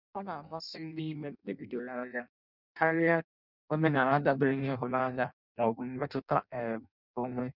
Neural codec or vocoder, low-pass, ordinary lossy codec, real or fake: codec, 16 kHz in and 24 kHz out, 0.6 kbps, FireRedTTS-2 codec; 5.4 kHz; none; fake